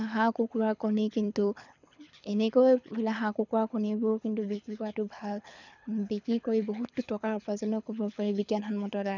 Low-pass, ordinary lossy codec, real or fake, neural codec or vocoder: 7.2 kHz; none; fake; codec, 24 kHz, 6 kbps, HILCodec